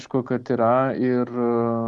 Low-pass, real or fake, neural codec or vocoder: 10.8 kHz; real; none